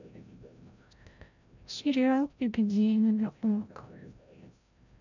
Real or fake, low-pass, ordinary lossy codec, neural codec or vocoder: fake; 7.2 kHz; none; codec, 16 kHz, 0.5 kbps, FreqCodec, larger model